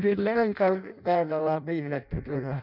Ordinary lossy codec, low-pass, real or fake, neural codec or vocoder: none; 5.4 kHz; fake; codec, 16 kHz in and 24 kHz out, 0.6 kbps, FireRedTTS-2 codec